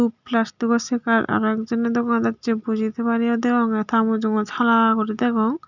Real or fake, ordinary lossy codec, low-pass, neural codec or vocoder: real; none; 7.2 kHz; none